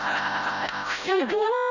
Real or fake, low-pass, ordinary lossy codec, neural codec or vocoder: fake; 7.2 kHz; none; codec, 16 kHz, 0.5 kbps, FreqCodec, smaller model